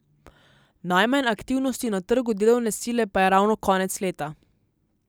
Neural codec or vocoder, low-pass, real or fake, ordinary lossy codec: none; none; real; none